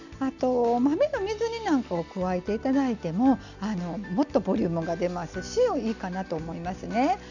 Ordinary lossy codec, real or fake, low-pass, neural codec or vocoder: none; real; 7.2 kHz; none